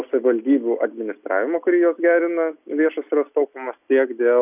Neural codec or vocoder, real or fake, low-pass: none; real; 3.6 kHz